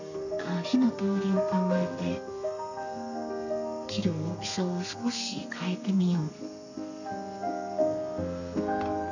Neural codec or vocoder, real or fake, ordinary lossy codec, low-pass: codec, 32 kHz, 1.9 kbps, SNAC; fake; none; 7.2 kHz